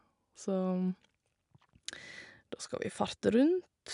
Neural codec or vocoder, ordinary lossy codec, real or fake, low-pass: none; none; real; 10.8 kHz